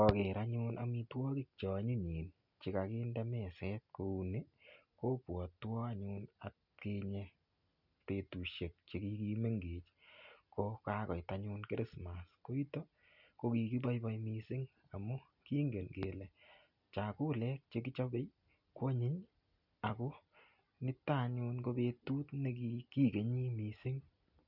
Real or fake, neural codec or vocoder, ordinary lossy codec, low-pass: real; none; none; 5.4 kHz